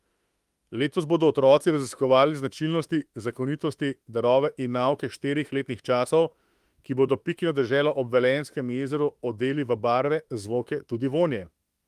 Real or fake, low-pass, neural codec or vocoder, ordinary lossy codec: fake; 14.4 kHz; autoencoder, 48 kHz, 32 numbers a frame, DAC-VAE, trained on Japanese speech; Opus, 32 kbps